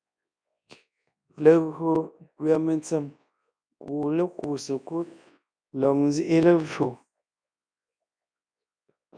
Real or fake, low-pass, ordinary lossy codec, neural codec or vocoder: fake; 9.9 kHz; MP3, 96 kbps; codec, 24 kHz, 0.9 kbps, WavTokenizer, large speech release